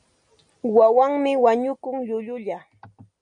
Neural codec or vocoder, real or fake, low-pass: none; real; 9.9 kHz